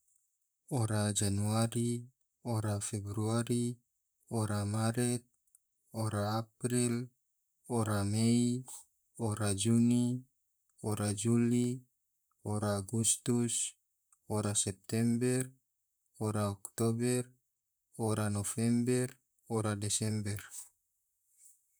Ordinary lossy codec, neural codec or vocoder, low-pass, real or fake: none; vocoder, 44.1 kHz, 128 mel bands, Pupu-Vocoder; none; fake